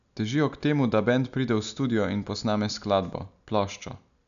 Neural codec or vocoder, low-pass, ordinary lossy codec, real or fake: none; 7.2 kHz; none; real